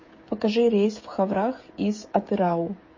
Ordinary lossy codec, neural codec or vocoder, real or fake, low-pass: MP3, 32 kbps; codec, 16 kHz, 16 kbps, FreqCodec, smaller model; fake; 7.2 kHz